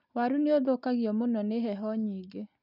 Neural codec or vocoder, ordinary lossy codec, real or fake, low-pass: none; none; real; 5.4 kHz